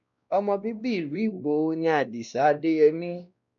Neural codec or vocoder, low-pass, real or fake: codec, 16 kHz, 1 kbps, X-Codec, WavLM features, trained on Multilingual LibriSpeech; 7.2 kHz; fake